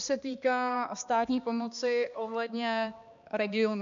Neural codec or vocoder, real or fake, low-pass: codec, 16 kHz, 2 kbps, X-Codec, HuBERT features, trained on balanced general audio; fake; 7.2 kHz